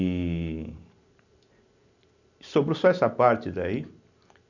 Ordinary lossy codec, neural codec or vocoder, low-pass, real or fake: none; none; 7.2 kHz; real